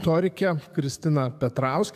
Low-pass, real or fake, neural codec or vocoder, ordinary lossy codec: 14.4 kHz; fake; codec, 44.1 kHz, 7.8 kbps, DAC; AAC, 96 kbps